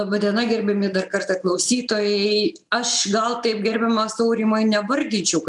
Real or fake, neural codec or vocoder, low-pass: real; none; 10.8 kHz